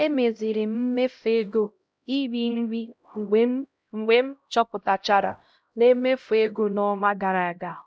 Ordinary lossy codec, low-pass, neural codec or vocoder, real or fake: none; none; codec, 16 kHz, 0.5 kbps, X-Codec, HuBERT features, trained on LibriSpeech; fake